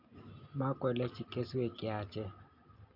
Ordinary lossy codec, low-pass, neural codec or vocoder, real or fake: none; 5.4 kHz; none; real